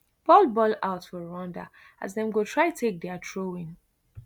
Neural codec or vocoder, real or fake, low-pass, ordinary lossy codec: none; real; 19.8 kHz; none